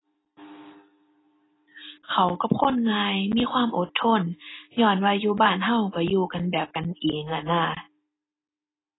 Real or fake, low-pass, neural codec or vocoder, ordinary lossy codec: real; 7.2 kHz; none; AAC, 16 kbps